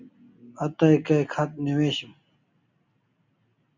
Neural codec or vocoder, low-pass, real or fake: none; 7.2 kHz; real